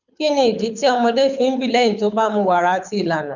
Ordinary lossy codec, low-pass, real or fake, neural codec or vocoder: none; 7.2 kHz; fake; codec, 24 kHz, 6 kbps, HILCodec